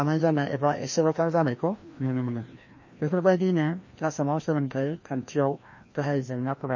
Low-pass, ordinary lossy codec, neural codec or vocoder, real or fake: 7.2 kHz; MP3, 32 kbps; codec, 16 kHz, 1 kbps, FreqCodec, larger model; fake